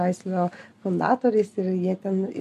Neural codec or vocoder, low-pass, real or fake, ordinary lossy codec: none; 14.4 kHz; real; MP3, 64 kbps